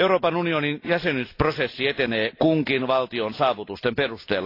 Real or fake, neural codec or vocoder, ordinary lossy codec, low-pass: real; none; AAC, 32 kbps; 5.4 kHz